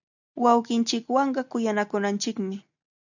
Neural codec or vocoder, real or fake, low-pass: none; real; 7.2 kHz